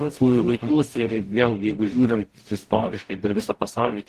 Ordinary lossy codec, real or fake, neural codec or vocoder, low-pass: Opus, 16 kbps; fake; codec, 44.1 kHz, 0.9 kbps, DAC; 14.4 kHz